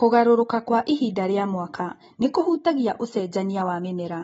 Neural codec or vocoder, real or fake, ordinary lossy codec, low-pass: none; real; AAC, 24 kbps; 19.8 kHz